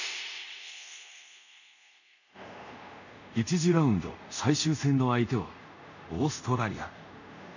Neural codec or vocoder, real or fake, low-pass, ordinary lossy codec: codec, 24 kHz, 0.5 kbps, DualCodec; fake; 7.2 kHz; none